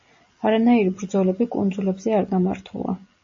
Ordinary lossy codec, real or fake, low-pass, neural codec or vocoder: MP3, 32 kbps; real; 7.2 kHz; none